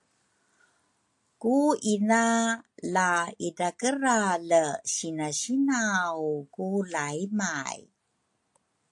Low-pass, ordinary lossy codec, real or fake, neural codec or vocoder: 10.8 kHz; AAC, 64 kbps; real; none